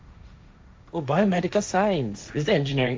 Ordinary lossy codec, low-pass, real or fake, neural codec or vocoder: none; none; fake; codec, 16 kHz, 1.1 kbps, Voila-Tokenizer